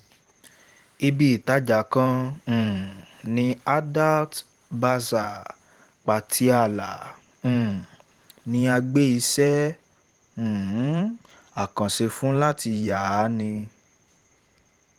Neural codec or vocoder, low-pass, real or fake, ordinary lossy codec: vocoder, 44.1 kHz, 128 mel bands every 512 samples, BigVGAN v2; 19.8 kHz; fake; Opus, 24 kbps